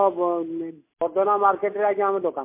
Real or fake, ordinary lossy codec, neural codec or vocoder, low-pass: real; MP3, 24 kbps; none; 3.6 kHz